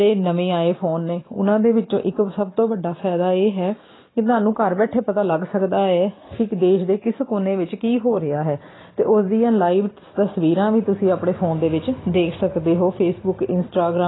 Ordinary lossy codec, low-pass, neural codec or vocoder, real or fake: AAC, 16 kbps; 7.2 kHz; none; real